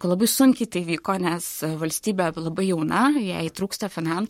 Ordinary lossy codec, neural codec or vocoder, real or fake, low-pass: MP3, 64 kbps; codec, 44.1 kHz, 7.8 kbps, Pupu-Codec; fake; 19.8 kHz